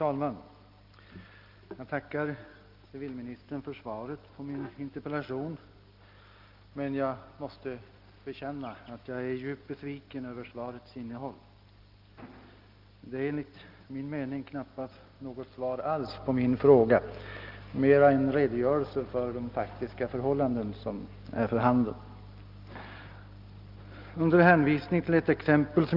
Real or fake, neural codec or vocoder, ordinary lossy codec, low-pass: real; none; Opus, 32 kbps; 5.4 kHz